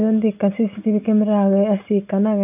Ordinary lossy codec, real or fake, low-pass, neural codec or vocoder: none; real; 3.6 kHz; none